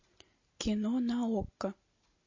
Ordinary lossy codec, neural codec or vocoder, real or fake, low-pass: MP3, 32 kbps; none; real; 7.2 kHz